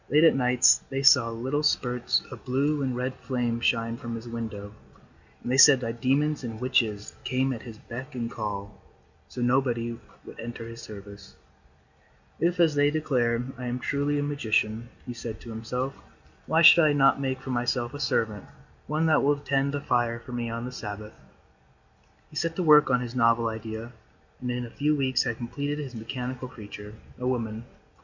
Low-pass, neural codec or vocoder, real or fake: 7.2 kHz; none; real